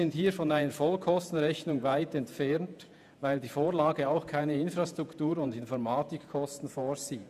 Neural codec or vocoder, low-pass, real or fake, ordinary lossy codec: vocoder, 48 kHz, 128 mel bands, Vocos; 14.4 kHz; fake; none